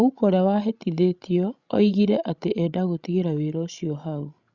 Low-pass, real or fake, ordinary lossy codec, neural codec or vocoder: 7.2 kHz; fake; Opus, 64 kbps; codec, 16 kHz, 16 kbps, FreqCodec, larger model